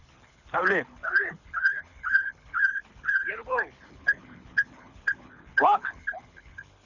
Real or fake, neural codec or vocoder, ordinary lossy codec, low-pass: fake; codec, 24 kHz, 6 kbps, HILCodec; none; 7.2 kHz